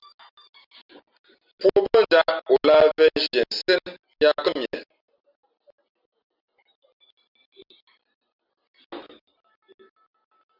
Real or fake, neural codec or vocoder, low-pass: real; none; 5.4 kHz